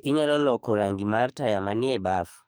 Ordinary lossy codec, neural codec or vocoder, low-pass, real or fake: none; codec, 44.1 kHz, 2.6 kbps, SNAC; none; fake